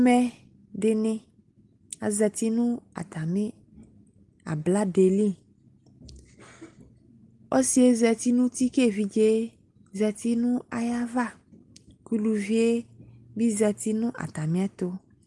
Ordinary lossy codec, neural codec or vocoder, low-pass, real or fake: Opus, 24 kbps; none; 10.8 kHz; real